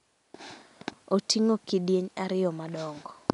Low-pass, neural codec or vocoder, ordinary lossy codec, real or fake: 10.8 kHz; none; none; real